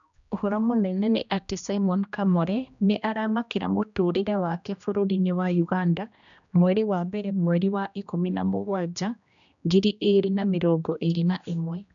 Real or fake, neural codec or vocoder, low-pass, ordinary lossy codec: fake; codec, 16 kHz, 1 kbps, X-Codec, HuBERT features, trained on general audio; 7.2 kHz; none